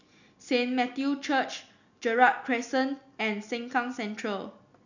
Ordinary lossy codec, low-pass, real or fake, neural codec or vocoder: none; 7.2 kHz; real; none